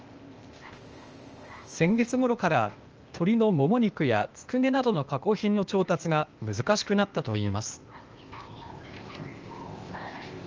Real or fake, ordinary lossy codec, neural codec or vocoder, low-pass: fake; Opus, 24 kbps; codec, 16 kHz, 0.8 kbps, ZipCodec; 7.2 kHz